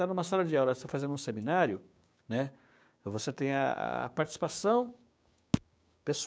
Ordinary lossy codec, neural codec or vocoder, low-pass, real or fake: none; codec, 16 kHz, 6 kbps, DAC; none; fake